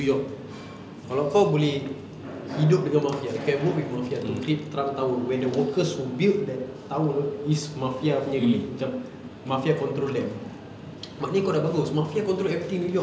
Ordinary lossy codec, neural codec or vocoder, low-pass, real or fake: none; none; none; real